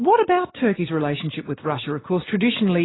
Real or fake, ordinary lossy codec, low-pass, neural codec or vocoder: real; AAC, 16 kbps; 7.2 kHz; none